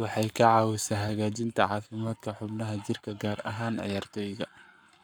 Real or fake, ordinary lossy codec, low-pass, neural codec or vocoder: fake; none; none; codec, 44.1 kHz, 7.8 kbps, Pupu-Codec